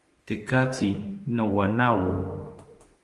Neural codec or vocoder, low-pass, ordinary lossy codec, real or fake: autoencoder, 48 kHz, 32 numbers a frame, DAC-VAE, trained on Japanese speech; 10.8 kHz; Opus, 24 kbps; fake